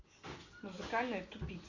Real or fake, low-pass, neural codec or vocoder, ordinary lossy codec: real; 7.2 kHz; none; Opus, 64 kbps